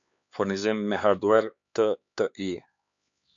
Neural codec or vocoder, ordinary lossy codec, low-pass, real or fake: codec, 16 kHz, 4 kbps, X-Codec, HuBERT features, trained on LibriSpeech; AAC, 64 kbps; 7.2 kHz; fake